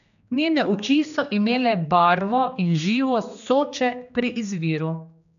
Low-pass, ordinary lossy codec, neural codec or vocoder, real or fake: 7.2 kHz; none; codec, 16 kHz, 2 kbps, X-Codec, HuBERT features, trained on general audio; fake